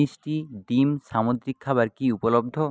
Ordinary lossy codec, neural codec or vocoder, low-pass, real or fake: none; none; none; real